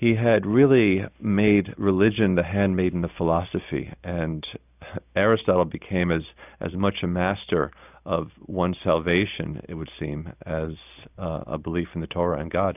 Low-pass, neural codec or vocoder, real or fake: 3.6 kHz; none; real